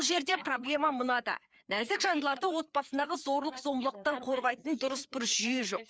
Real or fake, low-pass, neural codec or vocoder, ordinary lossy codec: fake; none; codec, 16 kHz, 4 kbps, FreqCodec, larger model; none